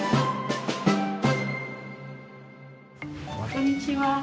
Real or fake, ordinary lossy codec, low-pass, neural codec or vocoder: real; none; none; none